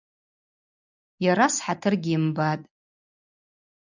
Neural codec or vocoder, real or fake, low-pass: none; real; 7.2 kHz